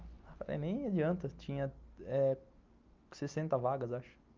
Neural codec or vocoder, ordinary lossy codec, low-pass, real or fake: none; Opus, 24 kbps; 7.2 kHz; real